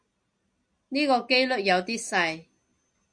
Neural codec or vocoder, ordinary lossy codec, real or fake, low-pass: none; MP3, 64 kbps; real; 9.9 kHz